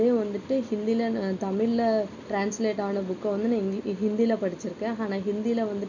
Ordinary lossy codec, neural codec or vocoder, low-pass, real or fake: none; none; 7.2 kHz; real